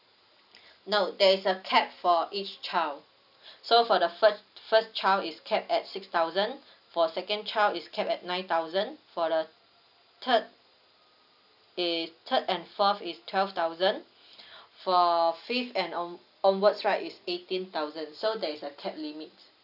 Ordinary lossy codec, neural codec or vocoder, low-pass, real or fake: none; none; 5.4 kHz; real